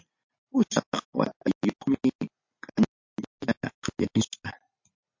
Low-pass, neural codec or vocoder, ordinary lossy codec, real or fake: 7.2 kHz; none; MP3, 48 kbps; real